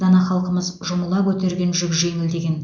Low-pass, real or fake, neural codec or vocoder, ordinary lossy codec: 7.2 kHz; real; none; none